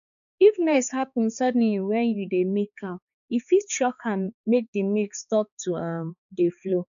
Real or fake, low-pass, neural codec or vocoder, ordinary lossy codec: fake; 7.2 kHz; codec, 16 kHz, 4 kbps, X-Codec, HuBERT features, trained on balanced general audio; none